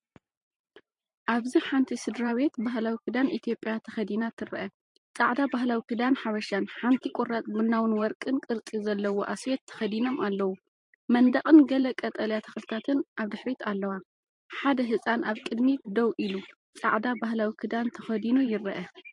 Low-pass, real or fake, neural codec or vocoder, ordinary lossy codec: 10.8 kHz; fake; vocoder, 44.1 kHz, 128 mel bands every 512 samples, BigVGAN v2; MP3, 48 kbps